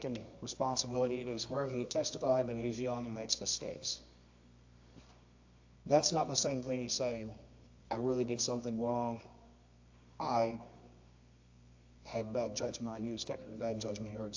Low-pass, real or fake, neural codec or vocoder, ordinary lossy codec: 7.2 kHz; fake; codec, 24 kHz, 0.9 kbps, WavTokenizer, medium music audio release; AAC, 48 kbps